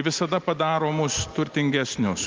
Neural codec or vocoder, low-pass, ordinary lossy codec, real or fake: none; 7.2 kHz; Opus, 32 kbps; real